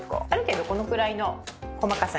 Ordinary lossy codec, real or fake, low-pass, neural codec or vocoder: none; real; none; none